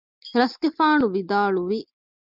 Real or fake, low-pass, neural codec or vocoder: real; 5.4 kHz; none